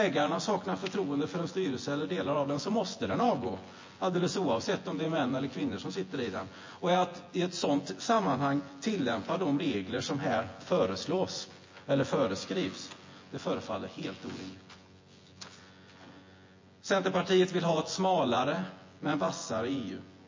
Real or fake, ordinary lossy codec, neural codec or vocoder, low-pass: fake; MP3, 32 kbps; vocoder, 24 kHz, 100 mel bands, Vocos; 7.2 kHz